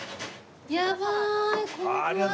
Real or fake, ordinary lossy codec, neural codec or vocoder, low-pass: real; none; none; none